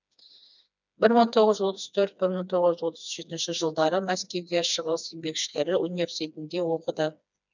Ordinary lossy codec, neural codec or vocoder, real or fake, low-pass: none; codec, 16 kHz, 2 kbps, FreqCodec, smaller model; fake; 7.2 kHz